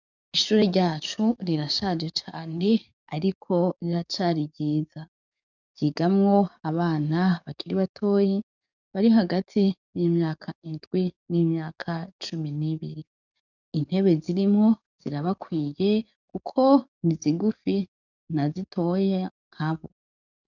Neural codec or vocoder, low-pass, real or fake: codec, 44.1 kHz, 7.8 kbps, DAC; 7.2 kHz; fake